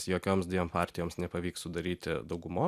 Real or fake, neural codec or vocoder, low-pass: real; none; 14.4 kHz